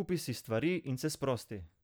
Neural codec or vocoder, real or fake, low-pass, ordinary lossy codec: none; real; none; none